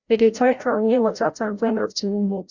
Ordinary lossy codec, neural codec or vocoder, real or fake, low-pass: Opus, 64 kbps; codec, 16 kHz, 0.5 kbps, FreqCodec, larger model; fake; 7.2 kHz